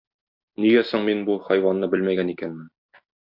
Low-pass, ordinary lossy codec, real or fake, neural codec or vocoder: 5.4 kHz; MP3, 48 kbps; real; none